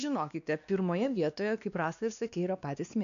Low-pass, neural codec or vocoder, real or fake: 7.2 kHz; codec, 16 kHz, 2 kbps, X-Codec, WavLM features, trained on Multilingual LibriSpeech; fake